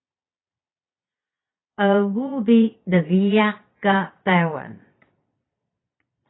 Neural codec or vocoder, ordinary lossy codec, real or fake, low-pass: vocoder, 22.05 kHz, 80 mel bands, Vocos; AAC, 16 kbps; fake; 7.2 kHz